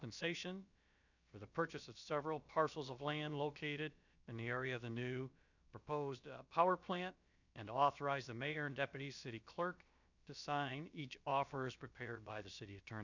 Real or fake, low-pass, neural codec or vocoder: fake; 7.2 kHz; codec, 16 kHz, about 1 kbps, DyCAST, with the encoder's durations